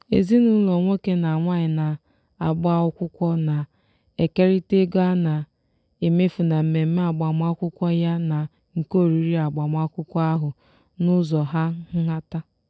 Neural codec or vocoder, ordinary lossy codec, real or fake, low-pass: none; none; real; none